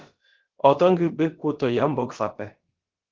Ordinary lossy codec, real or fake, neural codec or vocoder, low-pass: Opus, 16 kbps; fake; codec, 16 kHz, about 1 kbps, DyCAST, with the encoder's durations; 7.2 kHz